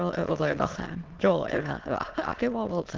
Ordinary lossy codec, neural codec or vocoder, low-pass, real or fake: Opus, 16 kbps; autoencoder, 22.05 kHz, a latent of 192 numbers a frame, VITS, trained on many speakers; 7.2 kHz; fake